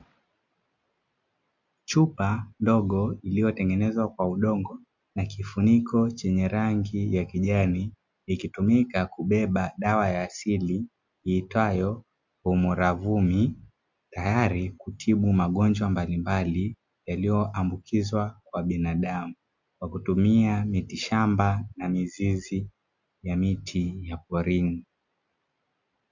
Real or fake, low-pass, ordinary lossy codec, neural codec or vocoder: real; 7.2 kHz; MP3, 64 kbps; none